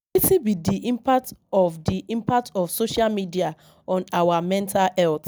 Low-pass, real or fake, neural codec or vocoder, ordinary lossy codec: none; real; none; none